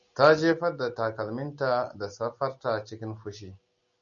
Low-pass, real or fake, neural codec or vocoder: 7.2 kHz; real; none